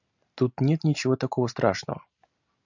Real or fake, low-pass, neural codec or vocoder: real; 7.2 kHz; none